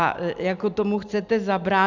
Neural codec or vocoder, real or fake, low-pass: none; real; 7.2 kHz